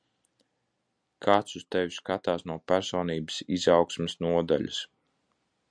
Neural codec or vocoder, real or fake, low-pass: none; real; 9.9 kHz